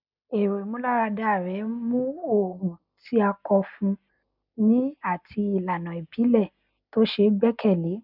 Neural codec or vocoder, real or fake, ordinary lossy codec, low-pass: none; real; none; 5.4 kHz